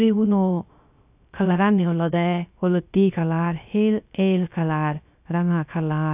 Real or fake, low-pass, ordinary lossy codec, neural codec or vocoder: fake; 3.6 kHz; AAC, 32 kbps; codec, 16 kHz, 0.3 kbps, FocalCodec